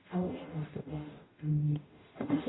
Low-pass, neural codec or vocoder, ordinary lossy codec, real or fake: 7.2 kHz; codec, 44.1 kHz, 0.9 kbps, DAC; AAC, 16 kbps; fake